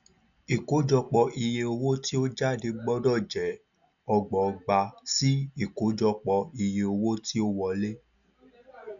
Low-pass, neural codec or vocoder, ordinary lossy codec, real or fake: 7.2 kHz; none; none; real